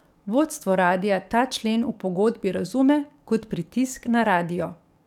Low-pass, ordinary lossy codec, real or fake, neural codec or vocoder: 19.8 kHz; none; fake; codec, 44.1 kHz, 7.8 kbps, DAC